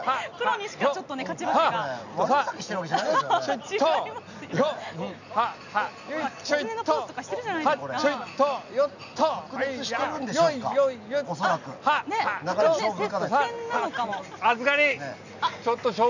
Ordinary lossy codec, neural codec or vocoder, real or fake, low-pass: none; none; real; 7.2 kHz